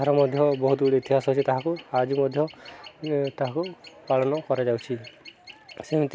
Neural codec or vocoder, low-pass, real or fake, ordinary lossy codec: none; none; real; none